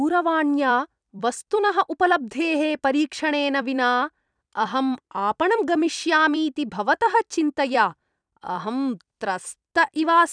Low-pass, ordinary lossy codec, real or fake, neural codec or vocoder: 9.9 kHz; none; fake; vocoder, 44.1 kHz, 128 mel bands every 256 samples, BigVGAN v2